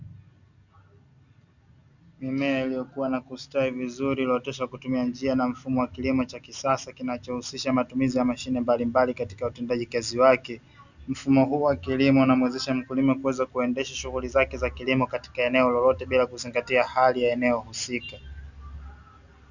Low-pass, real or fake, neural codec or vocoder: 7.2 kHz; real; none